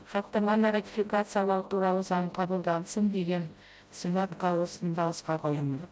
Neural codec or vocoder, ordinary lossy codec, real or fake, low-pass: codec, 16 kHz, 0.5 kbps, FreqCodec, smaller model; none; fake; none